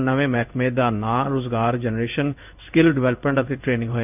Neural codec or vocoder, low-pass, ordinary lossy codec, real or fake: codec, 16 kHz in and 24 kHz out, 1 kbps, XY-Tokenizer; 3.6 kHz; none; fake